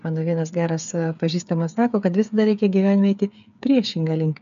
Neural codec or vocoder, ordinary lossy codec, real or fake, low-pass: codec, 16 kHz, 8 kbps, FreqCodec, smaller model; AAC, 96 kbps; fake; 7.2 kHz